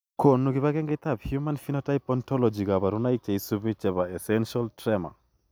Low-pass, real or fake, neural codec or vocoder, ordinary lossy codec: none; real; none; none